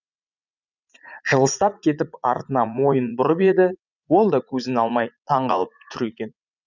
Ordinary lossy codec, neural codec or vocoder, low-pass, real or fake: none; vocoder, 44.1 kHz, 80 mel bands, Vocos; 7.2 kHz; fake